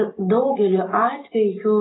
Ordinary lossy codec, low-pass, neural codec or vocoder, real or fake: AAC, 16 kbps; 7.2 kHz; vocoder, 44.1 kHz, 128 mel bands every 256 samples, BigVGAN v2; fake